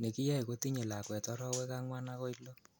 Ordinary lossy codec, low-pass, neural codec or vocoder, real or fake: none; none; none; real